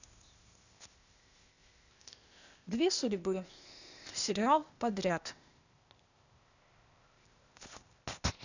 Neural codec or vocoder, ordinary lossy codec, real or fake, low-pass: codec, 16 kHz, 0.8 kbps, ZipCodec; none; fake; 7.2 kHz